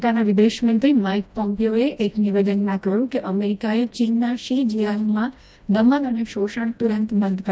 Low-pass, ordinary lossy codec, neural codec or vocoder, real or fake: none; none; codec, 16 kHz, 1 kbps, FreqCodec, smaller model; fake